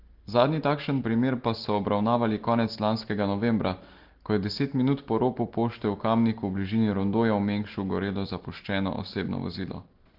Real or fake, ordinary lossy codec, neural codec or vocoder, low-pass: real; Opus, 16 kbps; none; 5.4 kHz